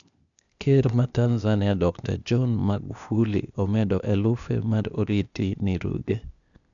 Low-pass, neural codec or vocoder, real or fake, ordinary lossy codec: 7.2 kHz; codec, 16 kHz, 0.8 kbps, ZipCodec; fake; none